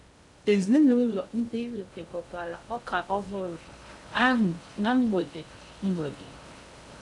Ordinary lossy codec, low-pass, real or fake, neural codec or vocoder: none; 10.8 kHz; fake; codec, 16 kHz in and 24 kHz out, 0.6 kbps, FocalCodec, streaming, 2048 codes